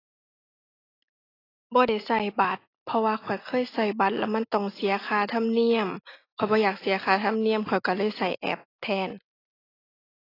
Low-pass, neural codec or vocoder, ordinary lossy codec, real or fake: 5.4 kHz; none; AAC, 24 kbps; real